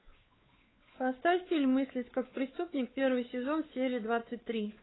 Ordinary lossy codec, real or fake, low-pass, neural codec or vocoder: AAC, 16 kbps; fake; 7.2 kHz; codec, 16 kHz, 4 kbps, X-Codec, WavLM features, trained on Multilingual LibriSpeech